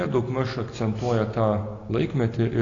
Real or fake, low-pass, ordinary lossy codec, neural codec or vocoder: real; 7.2 kHz; AAC, 32 kbps; none